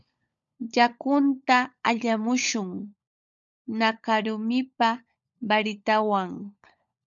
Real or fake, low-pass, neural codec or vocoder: fake; 7.2 kHz; codec, 16 kHz, 16 kbps, FunCodec, trained on LibriTTS, 50 frames a second